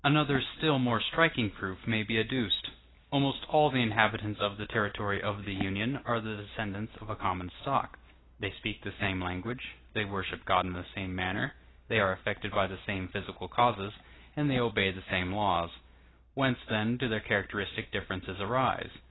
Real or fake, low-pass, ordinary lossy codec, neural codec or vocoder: real; 7.2 kHz; AAC, 16 kbps; none